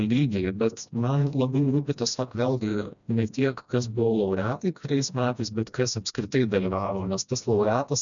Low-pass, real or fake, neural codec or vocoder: 7.2 kHz; fake; codec, 16 kHz, 1 kbps, FreqCodec, smaller model